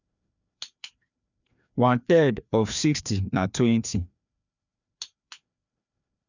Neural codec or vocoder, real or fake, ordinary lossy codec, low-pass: codec, 16 kHz, 2 kbps, FreqCodec, larger model; fake; none; 7.2 kHz